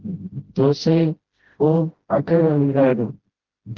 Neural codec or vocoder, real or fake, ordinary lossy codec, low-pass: codec, 16 kHz, 0.5 kbps, FreqCodec, smaller model; fake; Opus, 16 kbps; 7.2 kHz